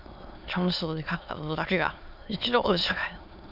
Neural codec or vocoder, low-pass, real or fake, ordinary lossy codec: autoencoder, 22.05 kHz, a latent of 192 numbers a frame, VITS, trained on many speakers; 5.4 kHz; fake; none